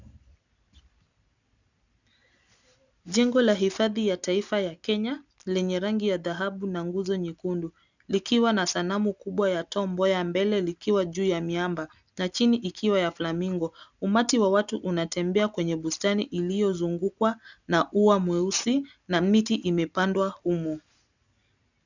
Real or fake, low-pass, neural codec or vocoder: real; 7.2 kHz; none